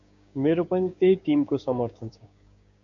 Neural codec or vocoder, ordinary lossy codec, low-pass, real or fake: codec, 16 kHz, 6 kbps, DAC; AAC, 64 kbps; 7.2 kHz; fake